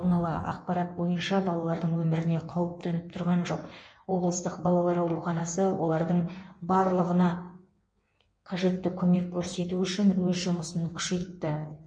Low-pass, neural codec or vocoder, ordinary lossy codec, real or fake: 9.9 kHz; codec, 16 kHz in and 24 kHz out, 1.1 kbps, FireRedTTS-2 codec; MP3, 48 kbps; fake